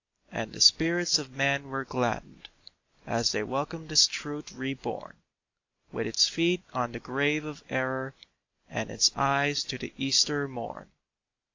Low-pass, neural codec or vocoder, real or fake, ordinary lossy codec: 7.2 kHz; none; real; AAC, 48 kbps